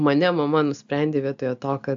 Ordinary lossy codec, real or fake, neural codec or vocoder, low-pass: AAC, 64 kbps; real; none; 7.2 kHz